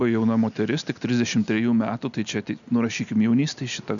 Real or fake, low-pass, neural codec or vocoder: real; 7.2 kHz; none